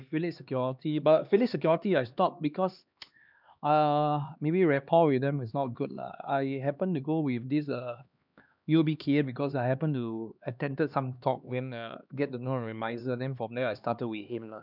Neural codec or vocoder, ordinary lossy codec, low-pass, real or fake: codec, 16 kHz, 2 kbps, X-Codec, HuBERT features, trained on LibriSpeech; none; 5.4 kHz; fake